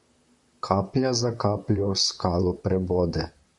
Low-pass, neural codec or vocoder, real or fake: 10.8 kHz; vocoder, 44.1 kHz, 128 mel bands, Pupu-Vocoder; fake